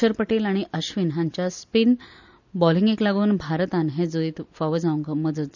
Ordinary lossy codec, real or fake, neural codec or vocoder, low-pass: none; real; none; 7.2 kHz